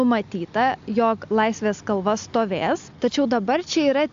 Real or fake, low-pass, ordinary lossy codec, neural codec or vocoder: real; 7.2 kHz; AAC, 64 kbps; none